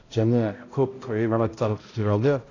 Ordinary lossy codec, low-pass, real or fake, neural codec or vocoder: AAC, 32 kbps; 7.2 kHz; fake; codec, 16 kHz, 0.5 kbps, X-Codec, HuBERT features, trained on balanced general audio